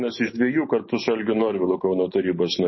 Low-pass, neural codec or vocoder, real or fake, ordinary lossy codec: 7.2 kHz; none; real; MP3, 24 kbps